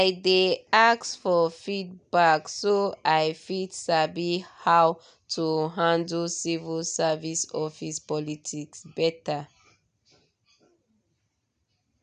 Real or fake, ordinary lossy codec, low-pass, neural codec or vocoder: real; none; 9.9 kHz; none